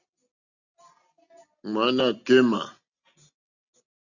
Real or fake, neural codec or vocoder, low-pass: real; none; 7.2 kHz